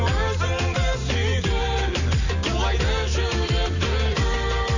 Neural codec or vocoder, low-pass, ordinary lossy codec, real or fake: none; 7.2 kHz; none; real